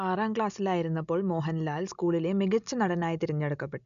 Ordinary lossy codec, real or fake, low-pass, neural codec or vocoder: none; real; 7.2 kHz; none